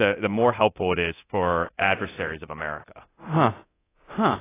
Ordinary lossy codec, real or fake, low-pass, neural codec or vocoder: AAC, 16 kbps; fake; 3.6 kHz; codec, 24 kHz, 0.9 kbps, DualCodec